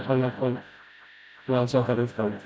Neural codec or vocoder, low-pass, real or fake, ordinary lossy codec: codec, 16 kHz, 0.5 kbps, FreqCodec, smaller model; none; fake; none